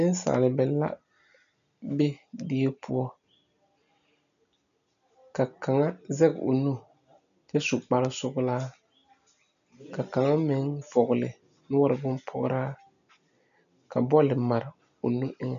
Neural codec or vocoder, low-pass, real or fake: none; 7.2 kHz; real